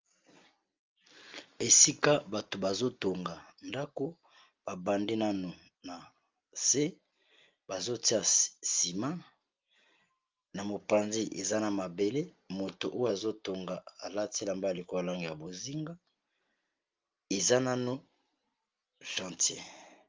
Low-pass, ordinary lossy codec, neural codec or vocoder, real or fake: 7.2 kHz; Opus, 32 kbps; none; real